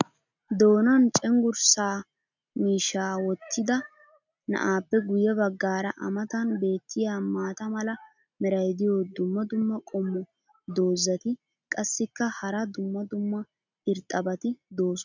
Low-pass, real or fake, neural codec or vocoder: 7.2 kHz; real; none